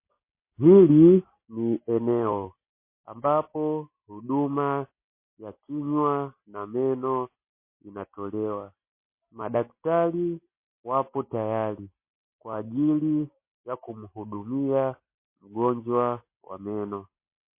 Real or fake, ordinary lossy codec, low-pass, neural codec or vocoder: real; MP3, 24 kbps; 3.6 kHz; none